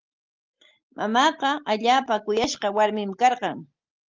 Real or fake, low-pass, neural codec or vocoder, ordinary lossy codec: real; 7.2 kHz; none; Opus, 24 kbps